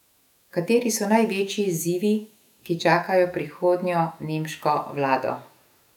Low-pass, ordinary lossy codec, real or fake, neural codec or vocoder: 19.8 kHz; none; fake; autoencoder, 48 kHz, 128 numbers a frame, DAC-VAE, trained on Japanese speech